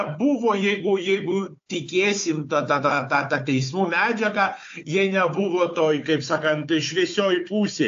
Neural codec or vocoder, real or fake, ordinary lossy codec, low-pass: codec, 16 kHz, 4 kbps, FunCodec, trained on Chinese and English, 50 frames a second; fake; AAC, 48 kbps; 7.2 kHz